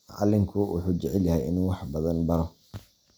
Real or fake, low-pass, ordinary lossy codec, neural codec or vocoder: real; none; none; none